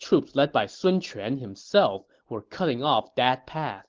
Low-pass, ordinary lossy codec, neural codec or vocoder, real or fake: 7.2 kHz; Opus, 16 kbps; none; real